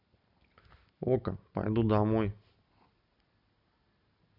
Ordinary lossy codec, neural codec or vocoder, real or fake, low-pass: none; none; real; 5.4 kHz